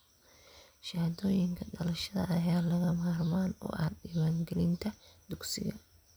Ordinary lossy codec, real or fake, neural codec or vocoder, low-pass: none; real; none; none